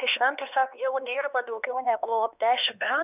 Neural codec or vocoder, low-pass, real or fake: codec, 16 kHz, 2 kbps, X-Codec, HuBERT features, trained on LibriSpeech; 3.6 kHz; fake